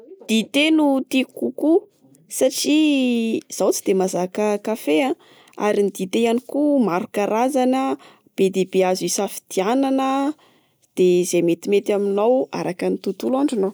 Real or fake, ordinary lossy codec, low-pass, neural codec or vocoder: real; none; none; none